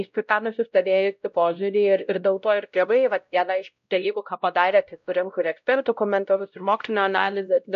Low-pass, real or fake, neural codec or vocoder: 7.2 kHz; fake; codec, 16 kHz, 0.5 kbps, X-Codec, WavLM features, trained on Multilingual LibriSpeech